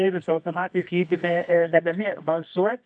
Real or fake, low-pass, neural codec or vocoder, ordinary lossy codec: fake; 9.9 kHz; codec, 24 kHz, 0.9 kbps, WavTokenizer, medium music audio release; AAC, 64 kbps